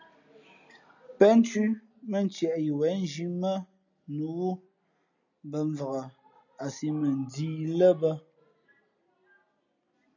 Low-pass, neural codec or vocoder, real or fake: 7.2 kHz; none; real